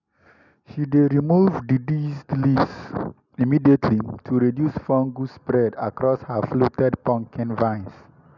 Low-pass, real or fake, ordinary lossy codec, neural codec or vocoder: 7.2 kHz; real; none; none